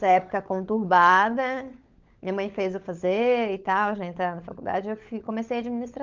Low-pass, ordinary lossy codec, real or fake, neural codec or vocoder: 7.2 kHz; Opus, 24 kbps; fake; codec, 16 kHz, 16 kbps, FunCodec, trained on LibriTTS, 50 frames a second